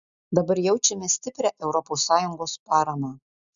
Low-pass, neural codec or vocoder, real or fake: 7.2 kHz; none; real